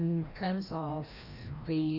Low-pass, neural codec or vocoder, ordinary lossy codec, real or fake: 5.4 kHz; codec, 16 kHz, 1 kbps, FreqCodec, larger model; none; fake